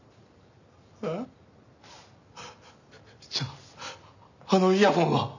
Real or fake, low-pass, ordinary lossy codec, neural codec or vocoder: real; 7.2 kHz; none; none